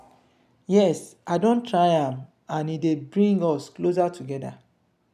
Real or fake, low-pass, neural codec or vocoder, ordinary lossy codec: real; 14.4 kHz; none; none